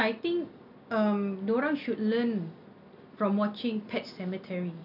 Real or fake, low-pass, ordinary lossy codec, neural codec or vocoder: real; 5.4 kHz; none; none